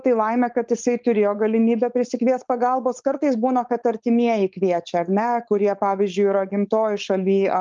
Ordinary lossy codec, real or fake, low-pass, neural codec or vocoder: Opus, 24 kbps; fake; 7.2 kHz; codec, 16 kHz, 4.8 kbps, FACodec